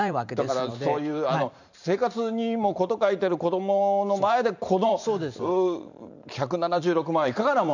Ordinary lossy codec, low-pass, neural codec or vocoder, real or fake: none; 7.2 kHz; vocoder, 44.1 kHz, 128 mel bands every 512 samples, BigVGAN v2; fake